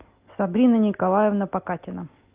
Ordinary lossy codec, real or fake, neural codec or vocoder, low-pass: Opus, 24 kbps; real; none; 3.6 kHz